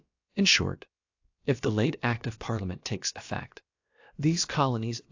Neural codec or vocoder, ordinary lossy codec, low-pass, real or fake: codec, 16 kHz, about 1 kbps, DyCAST, with the encoder's durations; AAC, 48 kbps; 7.2 kHz; fake